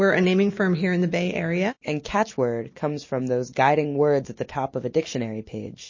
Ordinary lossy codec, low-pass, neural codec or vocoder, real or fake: MP3, 32 kbps; 7.2 kHz; none; real